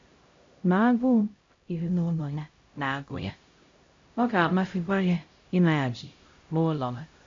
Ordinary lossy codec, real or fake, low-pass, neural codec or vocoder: MP3, 48 kbps; fake; 7.2 kHz; codec, 16 kHz, 0.5 kbps, X-Codec, HuBERT features, trained on LibriSpeech